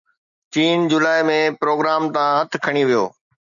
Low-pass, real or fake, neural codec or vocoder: 7.2 kHz; real; none